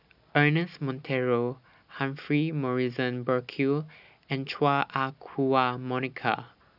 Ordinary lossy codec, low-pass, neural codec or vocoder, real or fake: none; 5.4 kHz; none; real